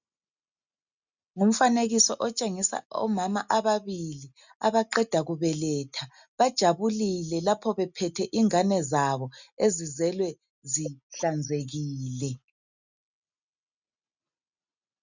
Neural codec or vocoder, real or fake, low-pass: none; real; 7.2 kHz